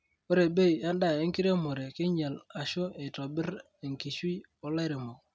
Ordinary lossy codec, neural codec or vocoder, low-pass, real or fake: none; none; none; real